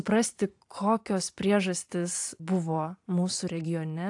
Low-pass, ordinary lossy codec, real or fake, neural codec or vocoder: 10.8 kHz; AAC, 64 kbps; fake; vocoder, 44.1 kHz, 128 mel bands every 512 samples, BigVGAN v2